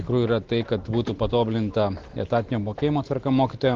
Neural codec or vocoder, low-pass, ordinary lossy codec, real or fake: none; 7.2 kHz; Opus, 16 kbps; real